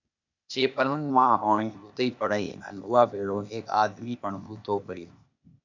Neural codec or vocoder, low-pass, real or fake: codec, 16 kHz, 0.8 kbps, ZipCodec; 7.2 kHz; fake